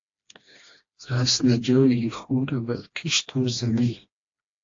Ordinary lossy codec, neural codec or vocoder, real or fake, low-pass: AAC, 48 kbps; codec, 16 kHz, 1 kbps, FreqCodec, smaller model; fake; 7.2 kHz